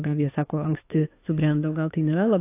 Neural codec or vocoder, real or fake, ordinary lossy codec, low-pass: autoencoder, 48 kHz, 32 numbers a frame, DAC-VAE, trained on Japanese speech; fake; AAC, 24 kbps; 3.6 kHz